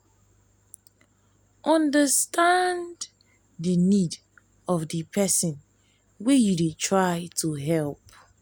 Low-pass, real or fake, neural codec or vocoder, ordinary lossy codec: none; real; none; none